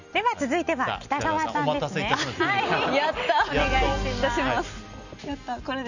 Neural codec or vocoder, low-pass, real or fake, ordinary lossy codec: none; 7.2 kHz; real; none